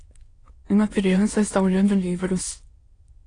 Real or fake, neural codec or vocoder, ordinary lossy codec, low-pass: fake; autoencoder, 22.05 kHz, a latent of 192 numbers a frame, VITS, trained on many speakers; AAC, 32 kbps; 9.9 kHz